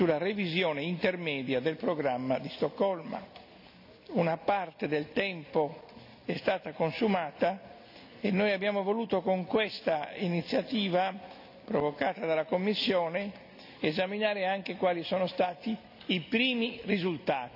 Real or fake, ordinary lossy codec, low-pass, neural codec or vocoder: real; none; 5.4 kHz; none